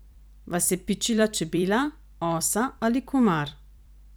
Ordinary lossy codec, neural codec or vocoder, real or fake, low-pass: none; vocoder, 44.1 kHz, 128 mel bands every 256 samples, BigVGAN v2; fake; none